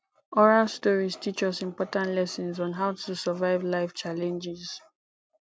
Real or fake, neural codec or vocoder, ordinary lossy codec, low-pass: real; none; none; none